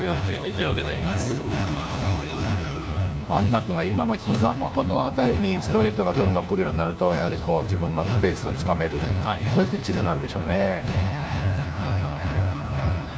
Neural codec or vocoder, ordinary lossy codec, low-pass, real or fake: codec, 16 kHz, 1 kbps, FunCodec, trained on LibriTTS, 50 frames a second; none; none; fake